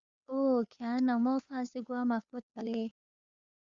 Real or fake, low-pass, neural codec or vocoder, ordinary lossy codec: fake; 7.2 kHz; codec, 16 kHz, 2 kbps, FunCodec, trained on Chinese and English, 25 frames a second; Opus, 64 kbps